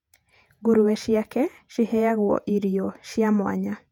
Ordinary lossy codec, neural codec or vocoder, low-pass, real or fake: none; vocoder, 48 kHz, 128 mel bands, Vocos; 19.8 kHz; fake